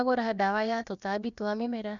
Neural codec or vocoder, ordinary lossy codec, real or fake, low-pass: codec, 16 kHz, about 1 kbps, DyCAST, with the encoder's durations; none; fake; 7.2 kHz